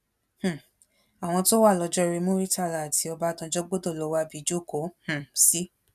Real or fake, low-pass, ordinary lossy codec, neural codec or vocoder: real; 14.4 kHz; none; none